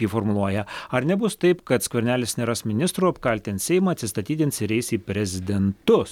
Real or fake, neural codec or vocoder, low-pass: real; none; 19.8 kHz